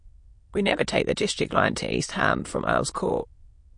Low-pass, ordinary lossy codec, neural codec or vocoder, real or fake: 9.9 kHz; MP3, 48 kbps; autoencoder, 22.05 kHz, a latent of 192 numbers a frame, VITS, trained on many speakers; fake